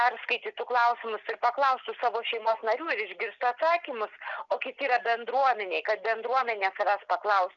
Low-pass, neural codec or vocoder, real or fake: 7.2 kHz; none; real